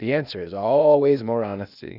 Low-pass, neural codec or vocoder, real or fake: 5.4 kHz; codec, 16 kHz, 0.8 kbps, ZipCodec; fake